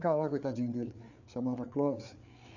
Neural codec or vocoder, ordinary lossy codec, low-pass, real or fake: codec, 16 kHz, 4 kbps, FreqCodec, larger model; none; 7.2 kHz; fake